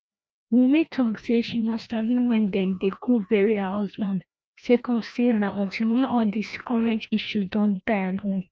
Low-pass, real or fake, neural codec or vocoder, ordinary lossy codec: none; fake; codec, 16 kHz, 1 kbps, FreqCodec, larger model; none